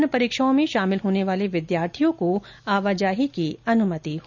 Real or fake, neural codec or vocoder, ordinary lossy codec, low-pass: real; none; none; 7.2 kHz